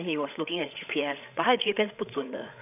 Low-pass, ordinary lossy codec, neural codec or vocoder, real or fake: 3.6 kHz; none; codec, 16 kHz, 8 kbps, FreqCodec, larger model; fake